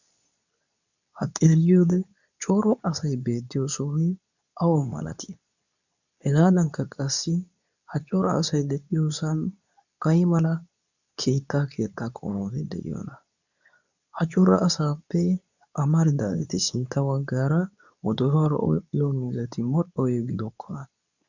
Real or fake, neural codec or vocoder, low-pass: fake; codec, 24 kHz, 0.9 kbps, WavTokenizer, medium speech release version 2; 7.2 kHz